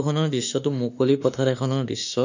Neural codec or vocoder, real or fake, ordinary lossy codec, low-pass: autoencoder, 48 kHz, 32 numbers a frame, DAC-VAE, trained on Japanese speech; fake; none; 7.2 kHz